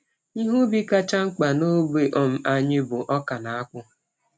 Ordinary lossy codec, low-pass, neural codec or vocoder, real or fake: none; none; none; real